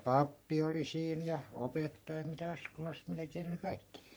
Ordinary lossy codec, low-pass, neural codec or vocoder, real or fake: none; none; codec, 44.1 kHz, 3.4 kbps, Pupu-Codec; fake